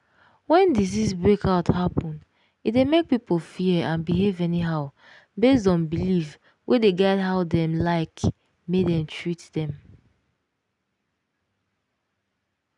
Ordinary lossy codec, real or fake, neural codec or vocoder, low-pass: none; real; none; 10.8 kHz